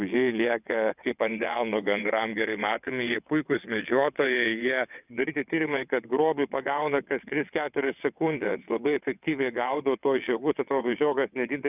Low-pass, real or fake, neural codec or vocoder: 3.6 kHz; fake; vocoder, 22.05 kHz, 80 mel bands, WaveNeXt